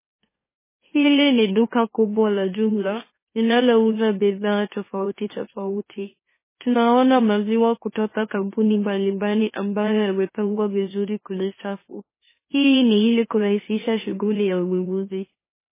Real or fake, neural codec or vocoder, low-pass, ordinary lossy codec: fake; autoencoder, 44.1 kHz, a latent of 192 numbers a frame, MeloTTS; 3.6 kHz; MP3, 16 kbps